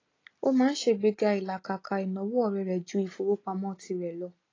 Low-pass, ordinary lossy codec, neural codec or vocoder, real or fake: 7.2 kHz; AAC, 32 kbps; none; real